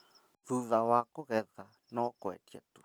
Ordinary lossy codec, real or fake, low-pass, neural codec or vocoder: none; real; none; none